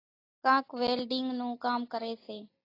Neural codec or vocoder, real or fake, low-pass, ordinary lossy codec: none; real; 5.4 kHz; AAC, 24 kbps